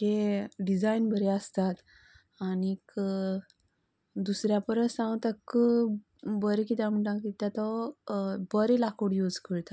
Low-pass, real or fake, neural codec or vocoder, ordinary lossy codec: none; real; none; none